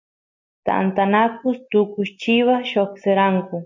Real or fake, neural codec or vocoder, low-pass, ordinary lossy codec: real; none; 7.2 kHz; MP3, 64 kbps